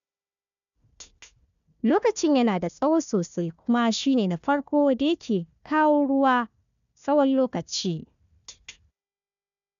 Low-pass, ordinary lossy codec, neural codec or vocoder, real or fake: 7.2 kHz; none; codec, 16 kHz, 1 kbps, FunCodec, trained on Chinese and English, 50 frames a second; fake